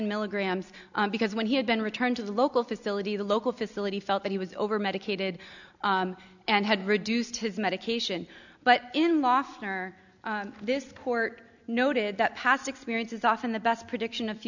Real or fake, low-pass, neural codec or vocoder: real; 7.2 kHz; none